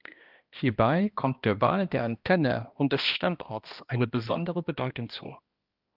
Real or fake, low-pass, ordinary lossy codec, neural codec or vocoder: fake; 5.4 kHz; Opus, 32 kbps; codec, 16 kHz, 1 kbps, X-Codec, HuBERT features, trained on balanced general audio